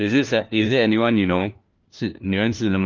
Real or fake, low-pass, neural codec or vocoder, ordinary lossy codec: fake; 7.2 kHz; codec, 16 kHz, 1 kbps, FunCodec, trained on LibriTTS, 50 frames a second; Opus, 32 kbps